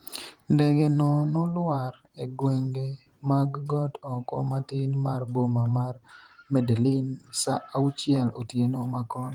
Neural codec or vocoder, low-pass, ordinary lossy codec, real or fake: vocoder, 44.1 kHz, 128 mel bands, Pupu-Vocoder; 19.8 kHz; Opus, 24 kbps; fake